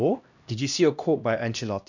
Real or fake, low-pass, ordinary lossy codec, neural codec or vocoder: fake; 7.2 kHz; none; codec, 16 kHz, 1 kbps, X-Codec, HuBERT features, trained on LibriSpeech